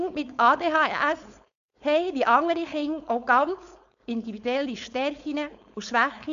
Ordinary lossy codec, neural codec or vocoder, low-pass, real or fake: none; codec, 16 kHz, 4.8 kbps, FACodec; 7.2 kHz; fake